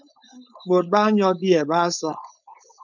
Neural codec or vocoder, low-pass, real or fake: codec, 16 kHz, 4.8 kbps, FACodec; 7.2 kHz; fake